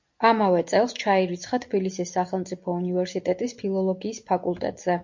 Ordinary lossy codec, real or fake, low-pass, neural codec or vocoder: MP3, 48 kbps; real; 7.2 kHz; none